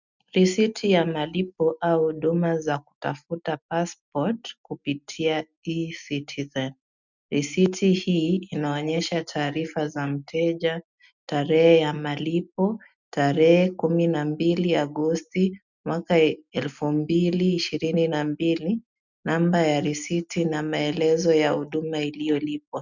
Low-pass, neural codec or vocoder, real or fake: 7.2 kHz; none; real